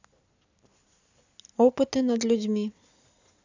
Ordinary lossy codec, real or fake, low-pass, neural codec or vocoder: AAC, 48 kbps; real; 7.2 kHz; none